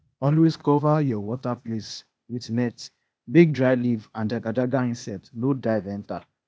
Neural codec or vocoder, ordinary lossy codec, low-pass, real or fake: codec, 16 kHz, 0.8 kbps, ZipCodec; none; none; fake